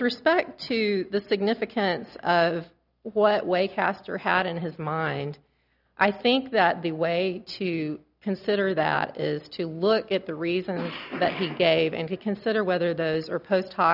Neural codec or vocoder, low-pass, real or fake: none; 5.4 kHz; real